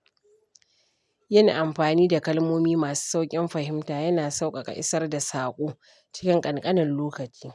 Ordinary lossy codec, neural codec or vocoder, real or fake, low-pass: none; none; real; none